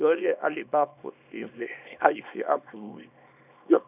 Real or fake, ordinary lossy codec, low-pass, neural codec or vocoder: fake; none; 3.6 kHz; codec, 24 kHz, 0.9 kbps, WavTokenizer, small release